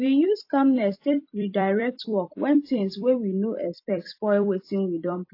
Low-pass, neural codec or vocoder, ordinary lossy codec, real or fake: 5.4 kHz; vocoder, 44.1 kHz, 128 mel bands every 256 samples, BigVGAN v2; AAC, 32 kbps; fake